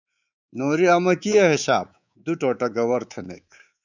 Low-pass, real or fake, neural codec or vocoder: 7.2 kHz; fake; codec, 24 kHz, 3.1 kbps, DualCodec